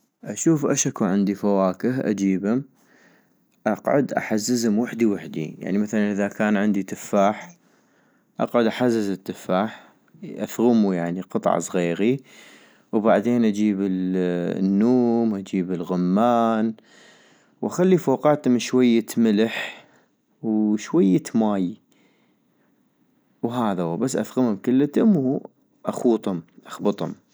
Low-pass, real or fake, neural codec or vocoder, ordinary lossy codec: none; real; none; none